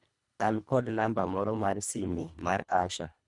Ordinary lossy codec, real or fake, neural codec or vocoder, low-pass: none; fake; codec, 24 kHz, 1.5 kbps, HILCodec; 10.8 kHz